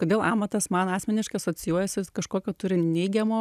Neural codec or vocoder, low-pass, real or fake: none; 14.4 kHz; real